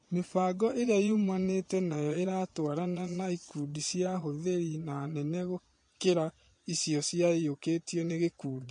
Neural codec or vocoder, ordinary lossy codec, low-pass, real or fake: vocoder, 22.05 kHz, 80 mel bands, Vocos; MP3, 48 kbps; 9.9 kHz; fake